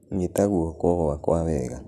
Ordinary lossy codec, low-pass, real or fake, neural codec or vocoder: none; 14.4 kHz; real; none